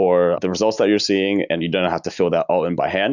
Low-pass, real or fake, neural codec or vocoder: 7.2 kHz; real; none